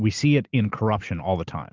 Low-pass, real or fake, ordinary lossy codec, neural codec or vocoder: 7.2 kHz; real; Opus, 24 kbps; none